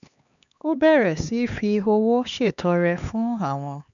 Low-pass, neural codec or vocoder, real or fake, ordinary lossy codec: 7.2 kHz; codec, 16 kHz, 2 kbps, X-Codec, HuBERT features, trained on LibriSpeech; fake; none